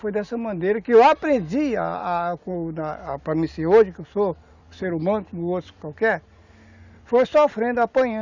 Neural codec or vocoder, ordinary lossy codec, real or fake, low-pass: none; Opus, 64 kbps; real; 7.2 kHz